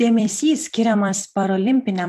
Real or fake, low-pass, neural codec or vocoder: fake; 14.4 kHz; vocoder, 44.1 kHz, 128 mel bands every 256 samples, BigVGAN v2